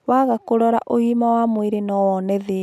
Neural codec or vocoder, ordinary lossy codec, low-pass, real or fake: none; none; 14.4 kHz; real